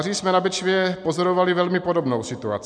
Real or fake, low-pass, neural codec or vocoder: real; 9.9 kHz; none